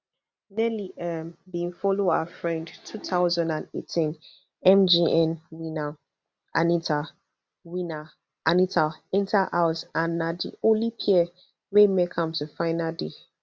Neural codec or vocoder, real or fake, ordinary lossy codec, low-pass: none; real; none; none